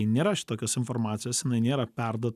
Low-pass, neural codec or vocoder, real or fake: 14.4 kHz; none; real